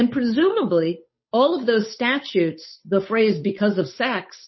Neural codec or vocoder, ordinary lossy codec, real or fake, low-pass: codec, 16 kHz, 8 kbps, FunCodec, trained on Chinese and English, 25 frames a second; MP3, 24 kbps; fake; 7.2 kHz